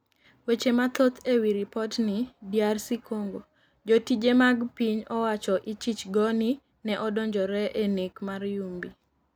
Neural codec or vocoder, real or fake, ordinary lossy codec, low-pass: none; real; none; none